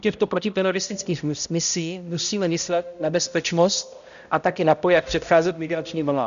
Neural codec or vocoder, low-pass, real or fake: codec, 16 kHz, 0.5 kbps, X-Codec, HuBERT features, trained on balanced general audio; 7.2 kHz; fake